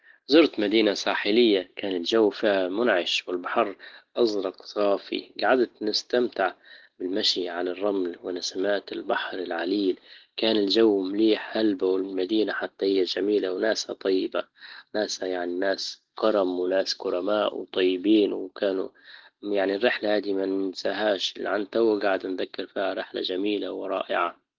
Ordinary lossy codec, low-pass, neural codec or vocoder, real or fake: Opus, 16 kbps; 7.2 kHz; none; real